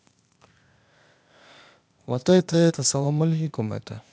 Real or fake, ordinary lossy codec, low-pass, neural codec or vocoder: fake; none; none; codec, 16 kHz, 0.8 kbps, ZipCodec